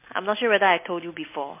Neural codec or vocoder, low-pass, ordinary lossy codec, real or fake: none; 3.6 kHz; MP3, 24 kbps; real